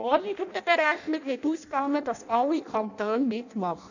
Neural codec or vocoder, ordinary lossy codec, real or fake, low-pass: codec, 16 kHz in and 24 kHz out, 0.6 kbps, FireRedTTS-2 codec; none; fake; 7.2 kHz